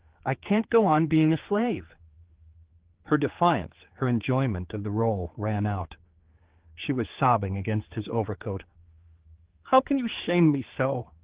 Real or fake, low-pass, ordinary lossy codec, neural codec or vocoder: fake; 3.6 kHz; Opus, 16 kbps; codec, 16 kHz, 4 kbps, X-Codec, HuBERT features, trained on general audio